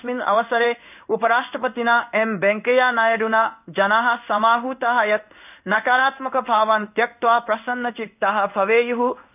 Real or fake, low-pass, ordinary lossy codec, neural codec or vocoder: fake; 3.6 kHz; none; codec, 16 kHz in and 24 kHz out, 1 kbps, XY-Tokenizer